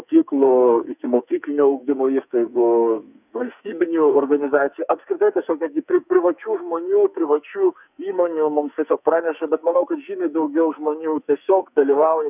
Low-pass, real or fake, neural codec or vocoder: 3.6 kHz; fake; codec, 44.1 kHz, 2.6 kbps, SNAC